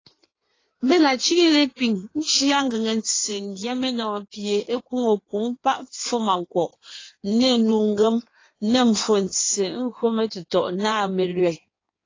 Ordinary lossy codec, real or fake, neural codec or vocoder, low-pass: AAC, 32 kbps; fake; codec, 16 kHz in and 24 kHz out, 1.1 kbps, FireRedTTS-2 codec; 7.2 kHz